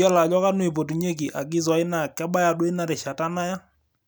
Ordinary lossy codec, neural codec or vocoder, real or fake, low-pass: none; none; real; none